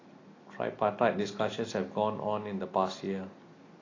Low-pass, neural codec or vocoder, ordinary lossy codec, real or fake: 7.2 kHz; none; AAC, 32 kbps; real